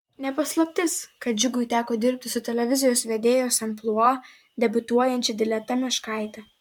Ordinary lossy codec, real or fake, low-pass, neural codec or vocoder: MP3, 96 kbps; fake; 19.8 kHz; vocoder, 44.1 kHz, 128 mel bands, Pupu-Vocoder